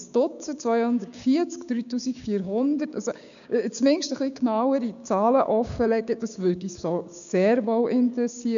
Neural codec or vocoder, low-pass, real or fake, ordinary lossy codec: codec, 16 kHz, 6 kbps, DAC; 7.2 kHz; fake; none